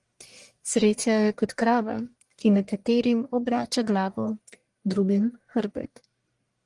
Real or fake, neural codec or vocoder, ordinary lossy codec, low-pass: fake; codec, 44.1 kHz, 1.7 kbps, Pupu-Codec; Opus, 24 kbps; 10.8 kHz